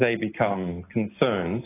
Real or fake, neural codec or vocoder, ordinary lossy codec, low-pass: real; none; AAC, 16 kbps; 3.6 kHz